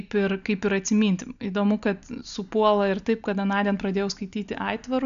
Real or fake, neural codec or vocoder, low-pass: real; none; 7.2 kHz